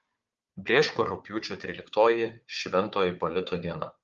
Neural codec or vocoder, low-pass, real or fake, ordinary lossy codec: codec, 16 kHz, 4 kbps, FunCodec, trained on Chinese and English, 50 frames a second; 7.2 kHz; fake; Opus, 32 kbps